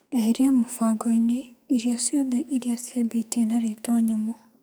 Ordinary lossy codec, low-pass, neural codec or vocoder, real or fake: none; none; codec, 44.1 kHz, 2.6 kbps, SNAC; fake